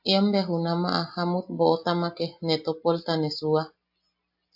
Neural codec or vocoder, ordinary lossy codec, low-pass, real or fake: none; AAC, 48 kbps; 5.4 kHz; real